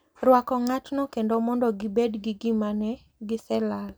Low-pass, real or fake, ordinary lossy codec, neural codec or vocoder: none; real; none; none